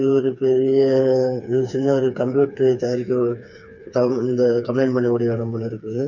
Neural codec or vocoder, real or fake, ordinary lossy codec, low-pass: codec, 16 kHz, 4 kbps, FreqCodec, smaller model; fake; none; 7.2 kHz